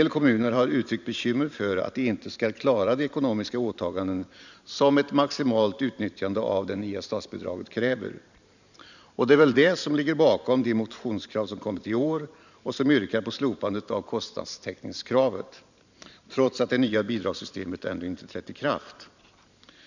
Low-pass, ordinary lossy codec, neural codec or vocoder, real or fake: 7.2 kHz; none; vocoder, 44.1 kHz, 128 mel bands every 512 samples, BigVGAN v2; fake